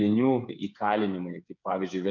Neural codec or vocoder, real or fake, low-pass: codec, 16 kHz, 8 kbps, FreqCodec, smaller model; fake; 7.2 kHz